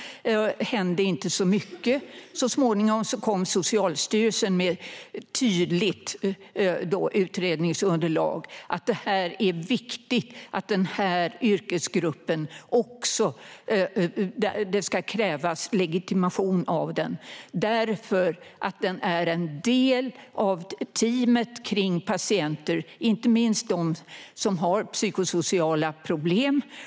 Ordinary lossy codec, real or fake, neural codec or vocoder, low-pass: none; real; none; none